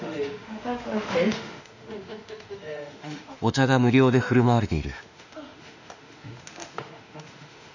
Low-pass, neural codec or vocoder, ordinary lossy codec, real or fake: 7.2 kHz; autoencoder, 48 kHz, 32 numbers a frame, DAC-VAE, trained on Japanese speech; none; fake